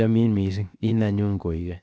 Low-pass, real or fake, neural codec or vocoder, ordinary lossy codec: none; fake; codec, 16 kHz, 0.7 kbps, FocalCodec; none